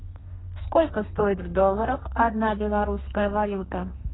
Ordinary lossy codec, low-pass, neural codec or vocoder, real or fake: AAC, 16 kbps; 7.2 kHz; codec, 32 kHz, 1.9 kbps, SNAC; fake